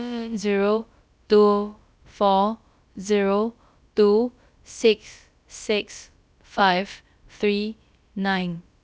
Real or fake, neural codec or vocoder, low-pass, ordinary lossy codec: fake; codec, 16 kHz, about 1 kbps, DyCAST, with the encoder's durations; none; none